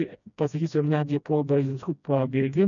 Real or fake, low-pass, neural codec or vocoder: fake; 7.2 kHz; codec, 16 kHz, 1 kbps, FreqCodec, smaller model